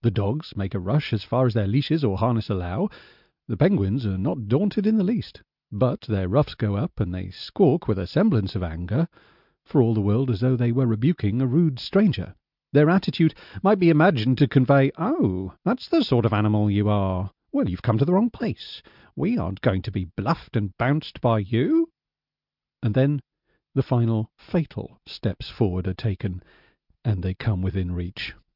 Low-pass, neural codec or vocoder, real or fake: 5.4 kHz; none; real